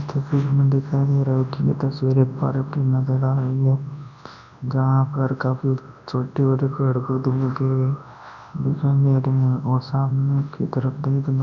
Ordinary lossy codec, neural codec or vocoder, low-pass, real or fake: none; codec, 24 kHz, 0.9 kbps, WavTokenizer, large speech release; 7.2 kHz; fake